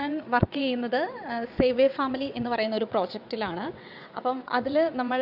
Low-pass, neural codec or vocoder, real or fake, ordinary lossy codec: 5.4 kHz; vocoder, 22.05 kHz, 80 mel bands, WaveNeXt; fake; AAC, 48 kbps